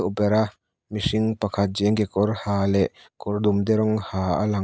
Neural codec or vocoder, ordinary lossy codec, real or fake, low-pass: none; none; real; none